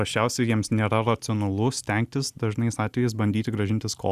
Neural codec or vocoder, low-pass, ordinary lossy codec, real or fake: none; 14.4 kHz; Opus, 64 kbps; real